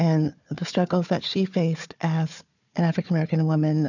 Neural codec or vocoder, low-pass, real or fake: codec, 16 kHz, 4 kbps, FunCodec, trained on Chinese and English, 50 frames a second; 7.2 kHz; fake